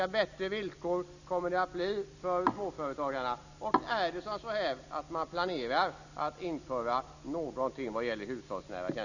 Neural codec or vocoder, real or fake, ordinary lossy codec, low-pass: none; real; none; 7.2 kHz